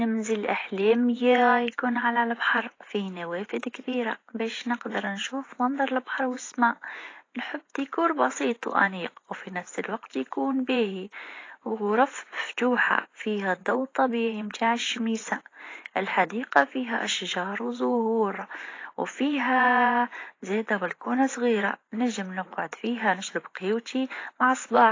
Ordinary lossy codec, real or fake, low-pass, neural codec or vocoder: AAC, 32 kbps; fake; 7.2 kHz; vocoder, 24 kHz, 100 mel bands, Vocos